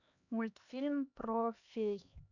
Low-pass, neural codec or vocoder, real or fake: 7.2 kHz; codec, 16 kHz, 2 kbps, X-Codec, HuBERT features, trained on LibriSpeech; fake